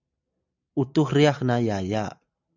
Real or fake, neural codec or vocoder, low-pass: real; none; 7.2 kHz